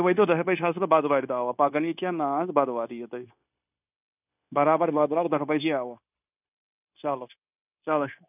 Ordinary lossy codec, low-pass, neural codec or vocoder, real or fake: none; 3.6 kHz; codec, 16 kHz, 0.9 kbps, LongCat-Audio-Codec; fake